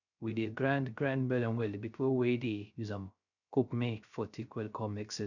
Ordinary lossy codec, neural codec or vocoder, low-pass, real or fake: none; codec, 16 kHz, 0.3 kbps, FocalCodec; 7.2 kHz; fake